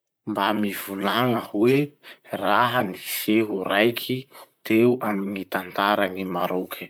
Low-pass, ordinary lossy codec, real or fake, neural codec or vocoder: none; none; fake; vocoder, 44.1 kHz, 128 mel bands, Pupu-Vocoder